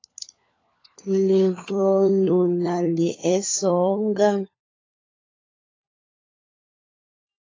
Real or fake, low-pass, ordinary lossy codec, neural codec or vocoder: fake; 7.2 kHz; AAC, 32 kbps; codec, 16 kHz, 4 kbps, FunCodec, trained on LibriTTS, 50 frames a second